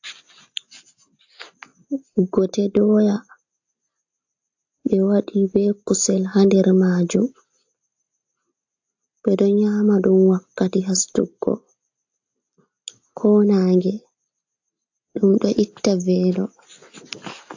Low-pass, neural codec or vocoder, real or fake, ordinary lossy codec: 7.2 kHz; none; real; AAC, 48 kbps